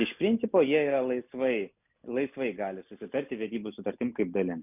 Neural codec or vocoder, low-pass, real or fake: none; 3.6 kHz; real